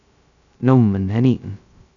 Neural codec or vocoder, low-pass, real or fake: codec, 16 kHz, 0.2 kbps, FocalCodec; 7.2 kHz; fake